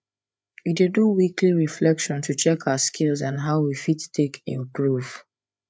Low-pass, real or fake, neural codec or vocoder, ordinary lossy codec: none; fake; codec, 16 kHz, 8 kbps, FreqCodec, larger model; none